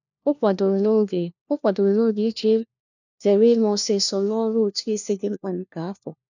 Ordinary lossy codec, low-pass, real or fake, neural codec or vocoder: none; 7.2 kHz; fake; codec, 16 kHz, 1 kbps, FunCodec, trained on LibriTTS, 50 frames a second